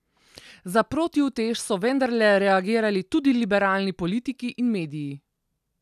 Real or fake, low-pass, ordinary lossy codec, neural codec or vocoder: real; 14.4 kHz; none; none